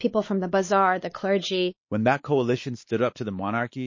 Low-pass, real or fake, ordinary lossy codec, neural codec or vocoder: 7.2 kHz; fake; MP3, 32 kbps; codec, 16 kHz, 4 kbps, X-Codec, WavLM features, trained on Multilingual LibriSpeech